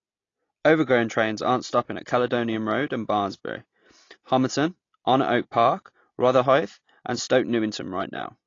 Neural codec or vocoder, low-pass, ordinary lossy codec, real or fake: none; 7.2 kHz; AAC, 32 kbps; real